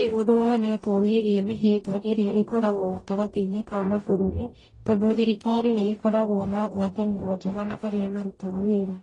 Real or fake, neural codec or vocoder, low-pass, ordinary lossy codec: fake; codec, 44.1 kHz, 0.9 kbps, DAC; 10.8 kHz; AAC, 32 kbps